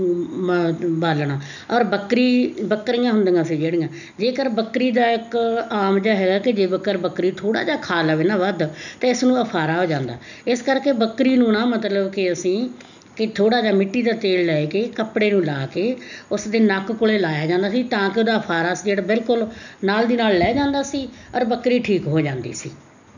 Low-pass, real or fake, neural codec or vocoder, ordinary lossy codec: 7.2 kHz; real; none; none